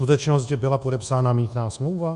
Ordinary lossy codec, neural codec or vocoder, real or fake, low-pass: Opus, 64 kbps; codec, 24 kHz, 1.2 kbps, DualCodec; fake; 10.8 kHz